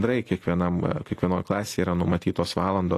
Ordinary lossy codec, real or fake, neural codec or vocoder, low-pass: AAC, 48 kbps; real; none; 14.4 kHz